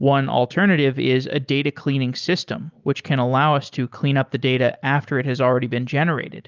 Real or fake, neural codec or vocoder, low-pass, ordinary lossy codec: real; none; 7.2 kHz; Opus, 24 kbps